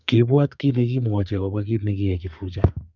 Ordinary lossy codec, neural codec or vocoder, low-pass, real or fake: none; codec, 32 kHz, 1.9 kbps, SNAC; 7.2 kHz; fake